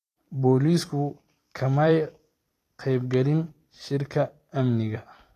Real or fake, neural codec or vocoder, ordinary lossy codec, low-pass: fake; vocoder, 44.1 kHz, 128 mel bands every 256 samples, BigVGAN v2; AAC, 48 kbps; 14.4 kHz